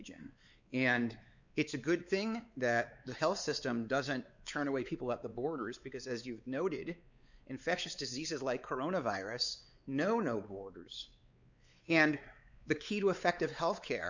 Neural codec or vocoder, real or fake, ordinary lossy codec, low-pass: codec, 16 kHz, 4 kbps, X-Codec, WavLM features, trained on Multilingual LibriSpeech; fake; Opus, 64 kbps; 7.2 kHz